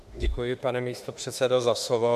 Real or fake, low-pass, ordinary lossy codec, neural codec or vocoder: fake; 14.4 kHz; MP3, 64 kbps; autoencoder, 48 kHz, 32 numbers a frame, DAC-VAE, trained on Japanese speech